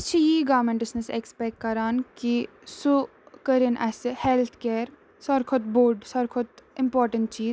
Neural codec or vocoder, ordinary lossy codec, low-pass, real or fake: none; none; none; real